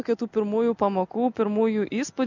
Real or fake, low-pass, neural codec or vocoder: real; 7.2 kHz; none